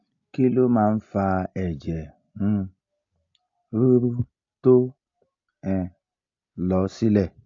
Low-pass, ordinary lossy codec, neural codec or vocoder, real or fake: 7.2 kHz; AAC, 48 kbps; none; real